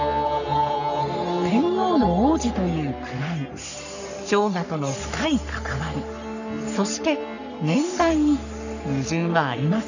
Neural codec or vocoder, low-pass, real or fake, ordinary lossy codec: codec, 44.1 kHz, 3.4 kbps, Pupu-Codec; 7.2 kHz; fake; none